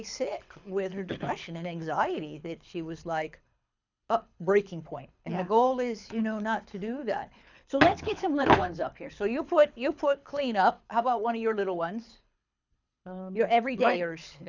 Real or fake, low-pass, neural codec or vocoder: fake; 7.2 kHz; codec, 24 kHz, 6 kbps, HILCodec